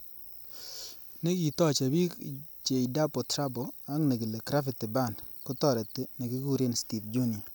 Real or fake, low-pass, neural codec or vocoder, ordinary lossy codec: real; none; none; none